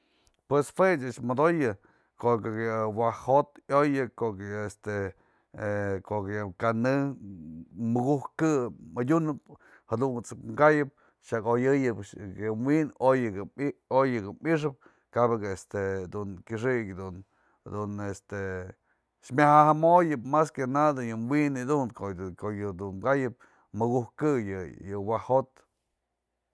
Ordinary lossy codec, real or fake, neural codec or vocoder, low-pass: none; real; none; none